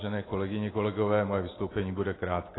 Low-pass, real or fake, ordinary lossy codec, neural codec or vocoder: 7.2 kHz; real; AAC, 16 kbps; none